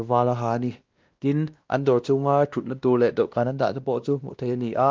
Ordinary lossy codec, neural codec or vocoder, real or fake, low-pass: Opus, 24 kbps; codec, 16 kHz, 1 kbps, X-Codec, WavLM features, trained on Multilingual LibriSpeech; fake; 7.2 kHz